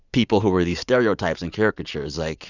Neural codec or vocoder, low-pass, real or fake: vocoder, 44.1 kHz, 80 mel bands, Vocos; 7.2 kHz; fake